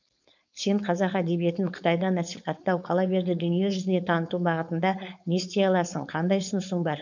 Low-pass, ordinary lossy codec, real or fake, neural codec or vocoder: 7.2 kHz; none; fake; codec, 16 kHz, 4.8 kbps, FACodec